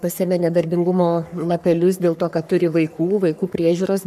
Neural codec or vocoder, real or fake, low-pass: codec, 44.1 kHz, 3.4 kbps, Pupu-Codec; fake; 14.4 kHz